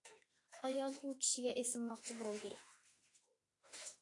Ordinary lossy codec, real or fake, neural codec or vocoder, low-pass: AAC, 48 kbps; fake; autoencoder, 48 kHz, 32 numbers a frame, DAC-VAE, trained on Japanese speech; 10.8 kHz